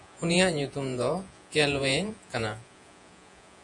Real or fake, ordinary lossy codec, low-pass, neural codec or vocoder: fake; AAC, 64 kbps; 10.8 kHz; vocoder, 48 kHz, 128 mel bands, Vocos